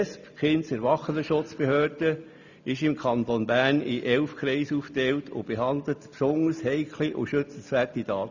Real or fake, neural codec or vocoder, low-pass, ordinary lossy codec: real; none; 7.2 kHz; none